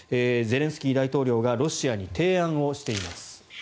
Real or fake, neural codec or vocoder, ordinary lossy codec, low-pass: real; none; none; none